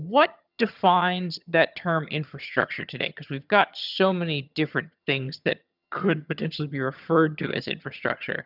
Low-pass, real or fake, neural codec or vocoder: 5.4 kHz; fake; vocoder, 22.05 kHz, 80 mel bands, HiFi-GAN